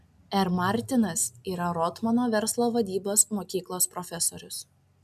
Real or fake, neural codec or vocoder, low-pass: real; none; 14.4 kHz